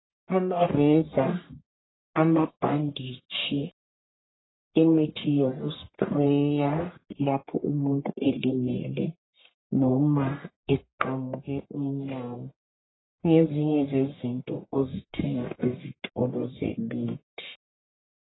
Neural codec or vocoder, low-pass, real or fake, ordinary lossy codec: codec, 44.1 kHz, 1.7 kbps, Pupu-Codec; 7.2 kHz; fake; AAC, 16 kbps